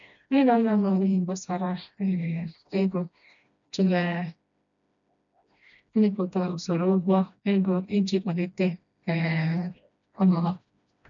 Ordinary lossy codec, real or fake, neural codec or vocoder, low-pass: none; fake; codec, 16 kHz, 1 kbps, FreqCodec, smaller model; 7.2 kHz